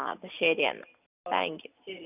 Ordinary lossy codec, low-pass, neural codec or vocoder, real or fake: none; 3.6 kHz; none; real